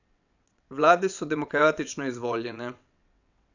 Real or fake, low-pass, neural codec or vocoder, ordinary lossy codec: fake; 7.2 kHz; vocoder, 22.05 kHz, 80 mel bands, WaveNeXt; none